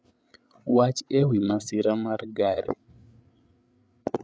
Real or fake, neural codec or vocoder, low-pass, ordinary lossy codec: fake; codec, 16 kHz, 8 kbps, FreqCodec, larger model; none; none